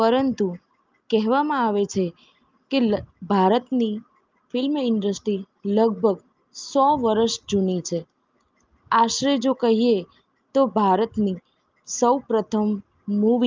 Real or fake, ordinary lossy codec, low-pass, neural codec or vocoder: real; Opus, 24 kbps; 7.2 kHz; none